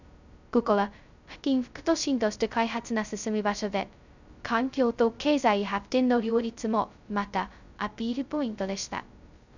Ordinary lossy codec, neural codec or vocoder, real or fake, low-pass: none; codec, 16 kHz, 0.2 kbps, FocalCodec; fake; 7.2 kHz